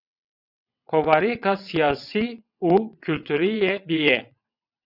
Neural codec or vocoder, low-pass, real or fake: vocoder, 22.05 kHz, 80 mel bands, WaveNeXt; 5.4 kHz; fake